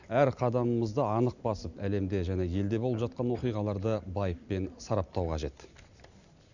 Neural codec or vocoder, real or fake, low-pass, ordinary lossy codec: none; real; 7.2 kHz; none